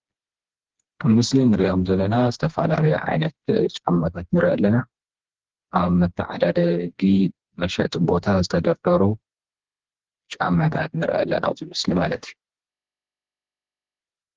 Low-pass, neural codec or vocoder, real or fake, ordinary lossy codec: 7.2 kHz; codec, 16 kHz, 2 kbps, FreqCodec, smaller model; fake; Opus, 16 kbps